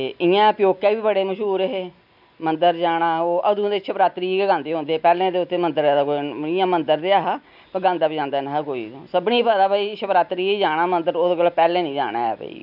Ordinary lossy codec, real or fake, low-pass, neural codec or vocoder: none; real; 5.4 kHz; none